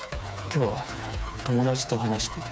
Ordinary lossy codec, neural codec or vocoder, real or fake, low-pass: none; codec, 16 kHz, 4 kbps, FreqCodec, smaller model; fake; none